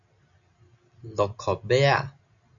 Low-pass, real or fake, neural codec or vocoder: 7.2 kHz; real; none